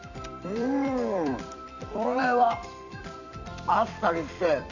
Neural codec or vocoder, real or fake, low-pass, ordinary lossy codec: vocoder, 44.1 kHz, 128 mel bands every 256 samples, BigVGAN v2; fake; 7.2 kHz; none